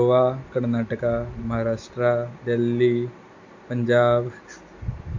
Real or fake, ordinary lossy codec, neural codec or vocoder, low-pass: real; MP3, 48 kbps; none; 7.2 kHz